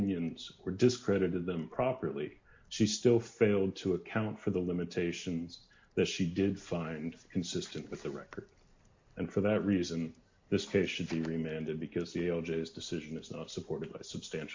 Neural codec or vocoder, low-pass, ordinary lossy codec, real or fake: none; 7.2 kHz; MP3, 48 kbps; real